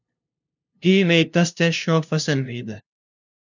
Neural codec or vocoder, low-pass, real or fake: codec, 16 kHz, 0.5 kbps, FunCodec, trained on LibriTTS, 25 frames a second; 7.2 kHz; fake